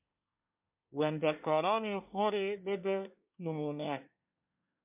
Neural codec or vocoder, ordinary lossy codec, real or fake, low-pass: codec, 24 kHz, 1 kbps, SNAC; MP3, 32 kbps; fake; 3.6 kHz